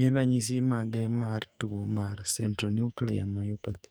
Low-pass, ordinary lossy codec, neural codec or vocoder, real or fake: none; none; codec, 44.1 kHz, 2.6 kbps, SNAC; fake